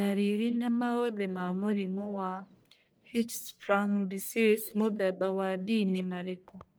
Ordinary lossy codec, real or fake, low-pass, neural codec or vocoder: none; fake; none; codec, 44.1 kHz, 1.7 kbps, Pupu-Codec